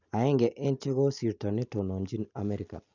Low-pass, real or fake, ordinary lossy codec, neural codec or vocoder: 7.2 kHz; real; none; none